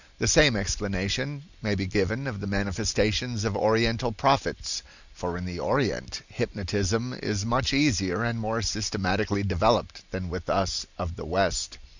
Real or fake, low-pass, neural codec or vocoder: real; 7.2 kHz; none